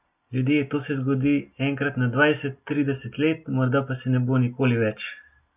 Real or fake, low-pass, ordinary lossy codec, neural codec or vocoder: real; 3.6 kHz; none; none